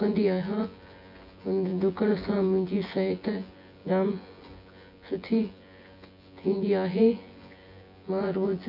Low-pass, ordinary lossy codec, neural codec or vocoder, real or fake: 5.4 kHz; none; vocoder, 24 kHz, 100 mel bands, Vocos; fake